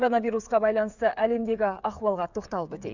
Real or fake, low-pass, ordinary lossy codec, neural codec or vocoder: fake; 7.2 kHz; none; codec, 16 kHz, 8 kbps, FreqCodec, smaller model